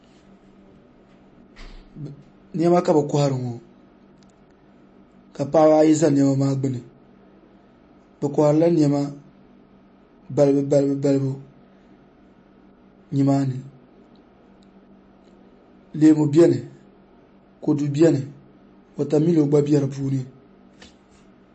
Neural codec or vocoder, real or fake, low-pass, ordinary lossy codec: none; real; 9.9 kHz; MP3, 32 kbps